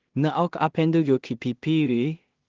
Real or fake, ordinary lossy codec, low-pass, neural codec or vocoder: fake; Opus, 16 kbps; 7.2 kHz; codec, 16 kHz in and 24 kHz out, 0.4 kbps, LongCat-Audio-Codec, two codebook decoder